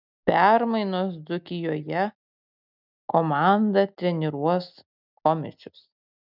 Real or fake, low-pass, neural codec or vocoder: real; 5.4 kHz; none